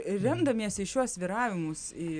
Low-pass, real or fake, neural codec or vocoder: 9.9 kHz; real; none